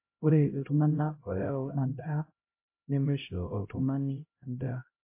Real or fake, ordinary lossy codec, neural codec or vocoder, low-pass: fake; MP3, 24 kbps; codec, 16 kHz, 0.5 kbps, X-Codec, HuBERT features, trained on LibriSpeech; 3.6 kHz